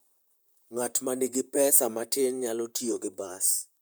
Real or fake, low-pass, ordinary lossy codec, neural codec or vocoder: fake; none; none; vocoder, 44.1 kHz, 128 mel bands, Pupu-Vocoder